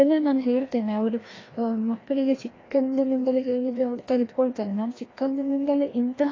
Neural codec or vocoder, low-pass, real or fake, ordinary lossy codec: codec, 16 kHz, 1 kbps, FreqCodec, larger model; 7.2 kHz; fake; AAC, 32 kbps